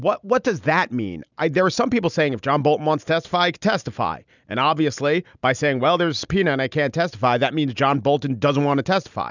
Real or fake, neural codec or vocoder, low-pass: real; none; 7.2 kHz